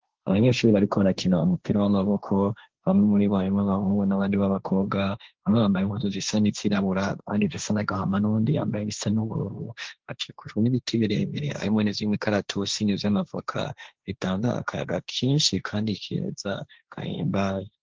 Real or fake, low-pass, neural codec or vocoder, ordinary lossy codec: fake; 7.2 kHz; codec, 16 kHz, 1.1 kbps, Voila-Tokenizer; Opus, 16 kbps